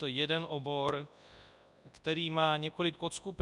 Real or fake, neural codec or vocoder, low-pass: fake; codec, 24 kHz, 0.9 kbps, WavTokenizer, large speech release; 10.8 kHz